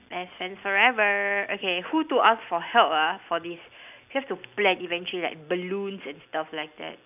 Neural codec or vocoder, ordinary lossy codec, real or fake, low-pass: none; none; real; 3.6 kHz